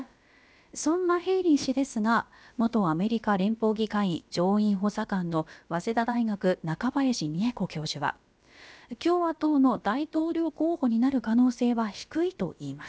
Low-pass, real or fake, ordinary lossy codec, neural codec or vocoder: none; fake; none; codec, 16 kHz, about 1 kbps, DyCAST, with the encoder's durations